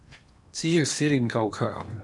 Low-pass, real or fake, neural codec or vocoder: 10.8 kHz; fake; codec, 16 kHz in and 24 kHz out, 0.8 kbps, FocalCodec, streaming, 65536 codes